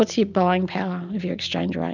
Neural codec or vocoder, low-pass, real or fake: none; 7.2 kHz; real